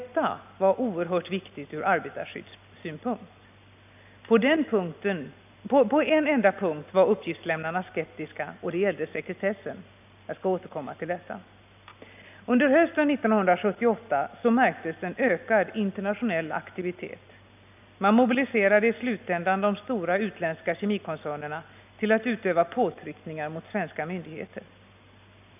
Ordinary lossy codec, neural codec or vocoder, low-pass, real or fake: none; none; 3.6 kHz; real